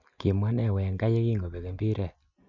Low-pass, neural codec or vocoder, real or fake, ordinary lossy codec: 7.2 kHz; none; real; none